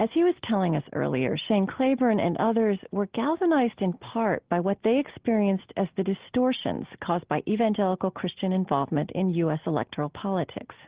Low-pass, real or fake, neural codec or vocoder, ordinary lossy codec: 3.6 kHz; real; none; Opus, 16 kbps